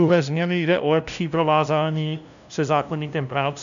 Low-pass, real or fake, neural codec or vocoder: 7.2 kHz; fake; codec, 16 kHz, 0.5 kbps, FunCodec, trained on LibriTTS, 25 frames a second